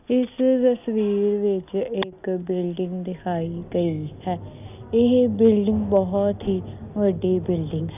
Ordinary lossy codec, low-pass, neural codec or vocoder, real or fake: none; 3.6 kHz; none; real